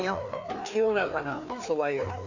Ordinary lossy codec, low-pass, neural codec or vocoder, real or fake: none; 7.2 kHz; codec, 16 kHz, 2 kbps, FreqCodec, larger model; fake